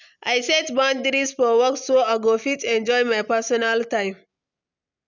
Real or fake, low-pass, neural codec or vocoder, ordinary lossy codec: real; 7.2 kHz; none; none